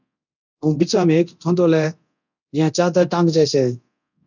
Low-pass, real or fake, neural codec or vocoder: 7.2 kHz; fake; codec, 24 kHz, 0.9 kbps, DualCodec